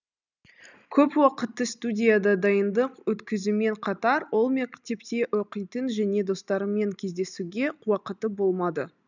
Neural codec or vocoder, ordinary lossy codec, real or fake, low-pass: none; none; real; 7.2 kHz